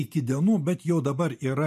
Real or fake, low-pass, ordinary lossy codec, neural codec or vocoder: real; 14.4 kHz; MP3, 64 kbps; none